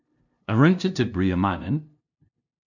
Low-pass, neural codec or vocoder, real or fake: 7.2 kHz; codec, 16 kHz, 0.5 kbps, FunCodec, trained on LibriTTS, 25 frames a second; fake